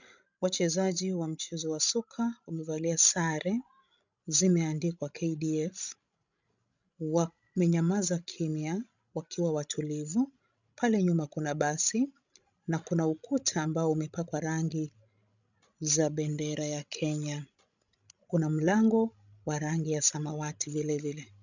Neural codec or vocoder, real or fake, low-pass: codec, 16 kHz, 16 kbps, FreqCodec, larger model; fake; 7.2 kHz